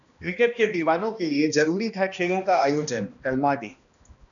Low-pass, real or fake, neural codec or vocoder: 7.2 kHz; fake; codec, 16 kHz, 1 kbps, X-Codec, HuBERT features, trained on balanced general audio